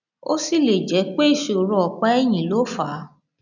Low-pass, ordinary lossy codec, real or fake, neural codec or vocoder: 7.2 kHz; none; real; none